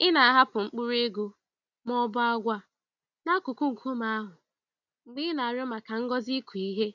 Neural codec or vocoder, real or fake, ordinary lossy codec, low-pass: none; real; none; 7.2 kHz